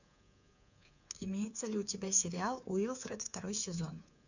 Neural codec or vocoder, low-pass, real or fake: codec, 24 kHz, 3.1 kbps, DualCodec; 7.2 kHz; fake